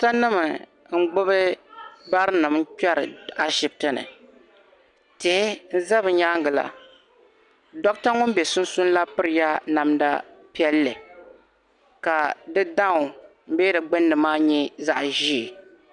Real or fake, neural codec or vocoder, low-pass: real; none; 10.8 kHz